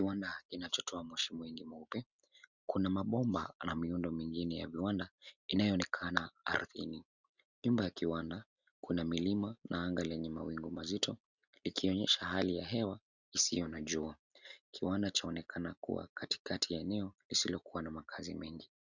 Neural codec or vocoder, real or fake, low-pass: none; real; 7.2 kHz